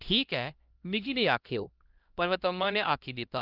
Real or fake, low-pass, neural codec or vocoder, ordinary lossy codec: fake; 5.4 kHz; codec, 16 kHz, 1 kbps, X-Codec, HuBERT features, trained on LibriSpeech; Opus, 32 kbps